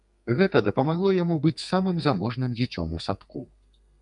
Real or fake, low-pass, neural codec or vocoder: fake; 10.8 kHz; codec, 32 kHz, 1.9 kbps, SNAC